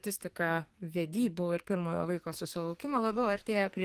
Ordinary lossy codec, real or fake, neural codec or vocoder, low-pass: Opus, 24 kbps; fake; codec, 44.1 kHz, 2.6 kbps, SNAC; 14.4 kHz